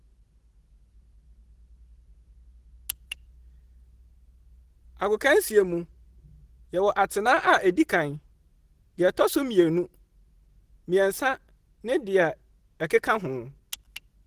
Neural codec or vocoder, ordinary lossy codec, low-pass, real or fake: none; Opus, 16 kbps; 14.4 kHz; real